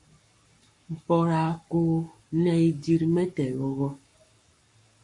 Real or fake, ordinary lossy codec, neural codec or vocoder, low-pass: fake; MP3, 48 kbps; codec, 44.1 kHz, 7.8 kbps, Pupu-Codec; 10.8 kHz